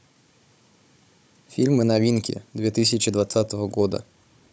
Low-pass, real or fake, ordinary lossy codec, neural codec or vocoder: none; fake; none; codec, 16 kHz, 16 kbps, FunCodec, trained on Chinese and English, 50 frames a second